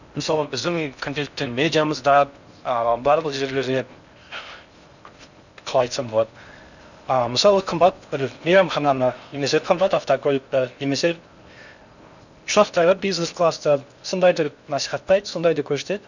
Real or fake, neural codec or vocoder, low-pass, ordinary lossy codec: fake; codec, 16 kHz in and 24 kHz out, 0.6 kbps, FocalCodec, streaming, 4096 codes; 7.2 kHz; none